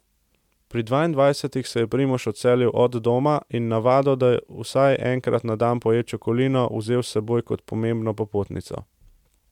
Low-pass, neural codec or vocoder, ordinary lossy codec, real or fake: 19.8 kHz; none; MP3, 96 kbps; real